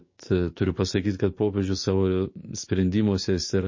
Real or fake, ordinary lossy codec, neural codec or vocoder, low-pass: fake; MP3, 32 kbps; codec, 16 kHz, 6 kbps, DAC; 7.2 kHz